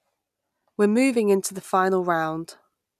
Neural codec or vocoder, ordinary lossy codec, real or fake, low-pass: none; none; real; 14.4 kHz